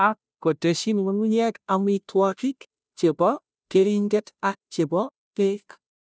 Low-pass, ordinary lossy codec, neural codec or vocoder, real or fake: none; none; codec, 16 kHz, 0.5 kbps, FunCodec, trained on Chinese and English, 25 frames a second; fake